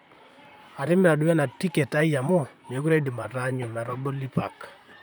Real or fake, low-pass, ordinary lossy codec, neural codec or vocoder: fake; none; none; vocoder, 44.1 kHz, 128 mel bands, Pupu-Vocoder